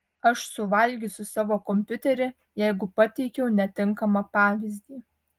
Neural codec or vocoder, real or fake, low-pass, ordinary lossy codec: none; real; 19.8 kHz; Opus, 24 kbps